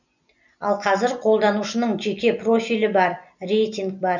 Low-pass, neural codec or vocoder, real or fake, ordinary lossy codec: 7.2 kHz; none; real; none